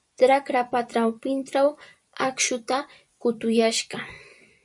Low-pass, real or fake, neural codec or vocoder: 10.8 kHz; fake; vocoder, 24 kHz, 100 mel bands, Vocos